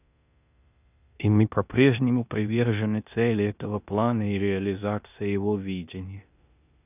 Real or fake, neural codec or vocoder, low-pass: fake; codec, 16 kHz in and 24 kHz out, 0.9 kbps, LongCat-Audio-Codec, four codebook decoder; 3.6 kHz